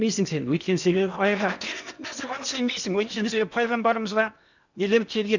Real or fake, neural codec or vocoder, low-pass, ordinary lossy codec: fake; codec, 16 kHz in and 24 kHz out, 0.8 kbps, FocalCodec, streaming, 65536 codes; 7.2 kHz; none